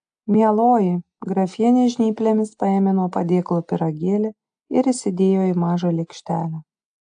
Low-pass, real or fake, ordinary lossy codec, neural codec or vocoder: 9.9 kHz; real; AAC, 64 kbps; none